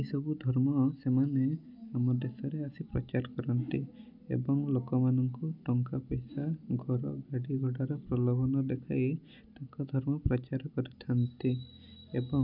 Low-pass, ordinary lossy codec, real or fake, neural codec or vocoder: 5.4 kHz; none; real; none